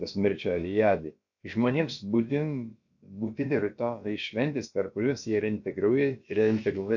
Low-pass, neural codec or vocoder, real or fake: 7.2 kHz; codec, 16 kHz, about 1 kbps, DyCAST, with the encoder's durations; fake